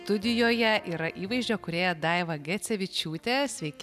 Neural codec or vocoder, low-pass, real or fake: none; 14.4 kHz; real